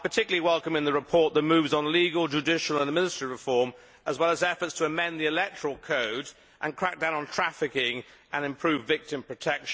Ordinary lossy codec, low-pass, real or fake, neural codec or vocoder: none; none; real; none